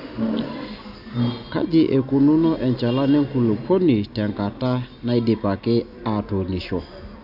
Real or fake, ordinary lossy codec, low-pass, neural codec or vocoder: real; none; 5.4 kHz; none